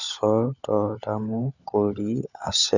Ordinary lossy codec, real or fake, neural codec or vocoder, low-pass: none; fake; codec, 16 kHz, 16 kbps, FunCodec, trained on LibriTTS, 50 frames a second; 7.2 kHz